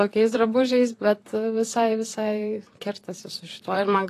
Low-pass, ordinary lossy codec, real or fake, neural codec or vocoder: 14.4 kHz; AAC, 48 kbps; fake; vocoder, 44.1 kHz, 128 mel bands, Pupu-Vocoder